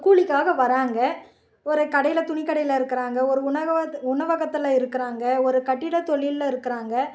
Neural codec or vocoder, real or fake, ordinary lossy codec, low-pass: none; real; none; none